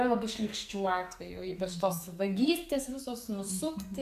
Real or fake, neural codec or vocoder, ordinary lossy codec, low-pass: fake; codec, 44.1 kHz, 2.6 kbps, SNAC; AAC, 96 kbps; 14.4 kHz